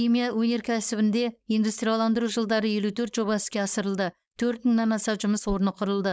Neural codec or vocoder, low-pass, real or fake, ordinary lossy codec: codec, 16 kHz, 4.8 kbps, FACodec; none; fake; none